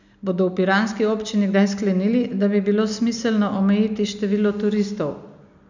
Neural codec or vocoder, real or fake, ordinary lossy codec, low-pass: none; real; none; 7.2 kHz